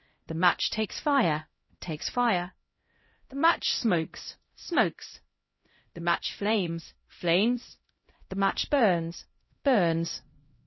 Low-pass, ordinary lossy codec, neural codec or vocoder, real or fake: 7.2 kHz; MP3, 24 kbps; codec, 16 kHz, 0.5 kbps, X-Codec, HuBERT features, trained on LibriSpeech; fake